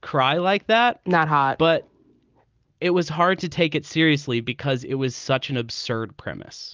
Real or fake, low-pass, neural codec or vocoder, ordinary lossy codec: real; 7.2 kHz; none; Opus, 24 kbps